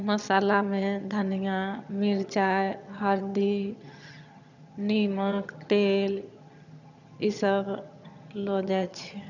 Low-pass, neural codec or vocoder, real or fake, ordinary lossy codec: 7.2 kHz; vocoder, 22.05 kHz, 80 mel bands, HiFi-GAN; fake; none